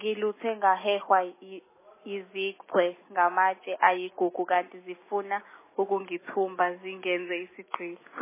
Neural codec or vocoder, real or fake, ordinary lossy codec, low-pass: none; real; MP3, 16 kbps; 3.6 kHz